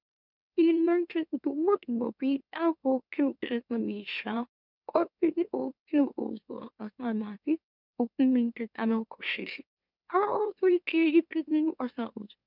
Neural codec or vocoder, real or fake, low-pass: autoencoder, 44.1 kHz, a latent of 192 numbers a frame, MeloTTS; fake; 5.4 kHz